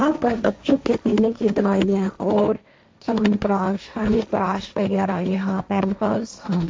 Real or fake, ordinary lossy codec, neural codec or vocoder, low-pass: fake; none; codec, 16 kHz, 1.1 kbps, Voila-Tokenizer; none